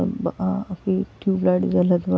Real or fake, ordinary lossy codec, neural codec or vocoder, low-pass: real; none; none; none